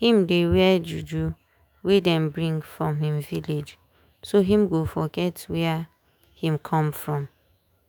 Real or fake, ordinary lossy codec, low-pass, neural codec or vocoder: fake; none; none; autoencoder, 48 kHz, 128 numbers a frame, DAC-VAE, trained on Japanese speech